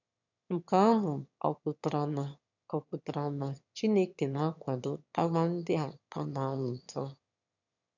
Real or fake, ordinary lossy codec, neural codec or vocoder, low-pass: fake; none; autoencoder, 22.05 kHz, a latent of 192 numbers a frame, VITS, trained on one speaker; 7.2 kHz